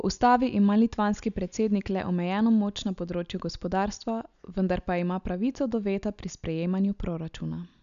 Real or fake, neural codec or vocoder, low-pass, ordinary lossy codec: real; none; 7.2 kHz; none